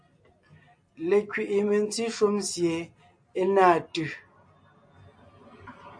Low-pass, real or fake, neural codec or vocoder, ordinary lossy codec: 9.9 kHz; real; none; AAC, 64 kbps